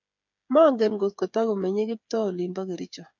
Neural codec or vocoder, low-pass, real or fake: codec, 16 kHz, 8 kbps, FreqCodec, smaller model; 7.2 kHz; fake